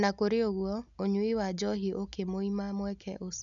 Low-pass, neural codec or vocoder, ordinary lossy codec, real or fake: 7.2 kHz; none; none; real